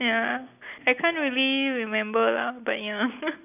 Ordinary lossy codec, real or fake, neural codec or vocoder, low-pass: none; real; none; 3.6 kHz